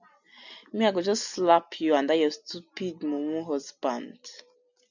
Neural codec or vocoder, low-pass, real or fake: none; 7.2 kHz; real